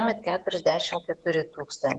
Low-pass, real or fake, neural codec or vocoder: 10.8 kHz; fake; vocoder, 48 kHz, 128 mel bands, Vocos